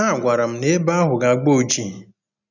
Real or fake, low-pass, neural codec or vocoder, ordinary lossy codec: real; 7.2 kHz; none; none